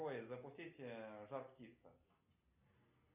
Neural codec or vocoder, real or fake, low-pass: none; real; 3.6 kHz